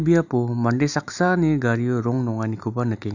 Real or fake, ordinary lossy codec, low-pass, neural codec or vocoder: real; none; 7.2 kHz; none